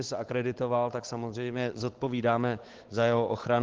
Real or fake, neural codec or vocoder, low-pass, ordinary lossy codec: fake; codec, 16 kHz, 8 kbps, FunCodec, trained on Chinese and English, 25 frames a second; 7.2 kHz; Opus, 16 kbps